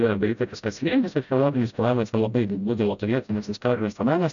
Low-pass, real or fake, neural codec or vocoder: 7.2 kHz; fake; codec, 16 kHz, 0.5 kbps, FreqCodec, smaller model